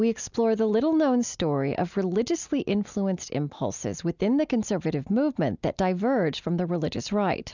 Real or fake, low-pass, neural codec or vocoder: real; 7.2 kHz; none